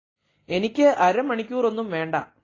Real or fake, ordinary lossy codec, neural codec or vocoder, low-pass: real; AAC, 32 kbps; none; 7.2 kHz